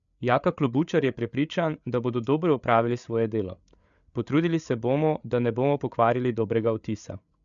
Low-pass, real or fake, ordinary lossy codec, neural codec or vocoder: 7.2 kHz; fake; MP3, 96 kbps; codec, 16 kHz, 8 kbps, FreqCodec, larger model